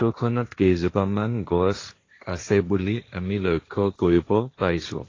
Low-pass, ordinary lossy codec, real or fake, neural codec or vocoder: 7.2 kHz; AAC, 32 kbps; fake; codec, 16 kHz, 1.1 kbps, Voila-Tokenizer